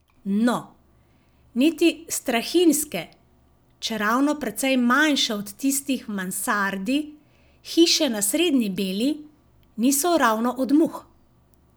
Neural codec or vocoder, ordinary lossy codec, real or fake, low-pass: none; none; real; none